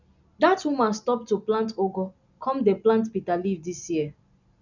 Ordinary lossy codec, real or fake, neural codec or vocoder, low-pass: none; real; none; 7.2 kHz